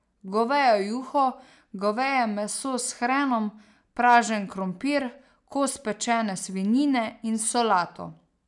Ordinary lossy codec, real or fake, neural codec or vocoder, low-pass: none; real; none; 10.8 kHz